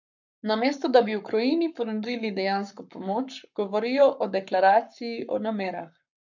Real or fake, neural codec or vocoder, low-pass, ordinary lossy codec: fake; codec, 44.1 kHz, 7.8 kbps, Pupu-Codec; 7.2 kHz; none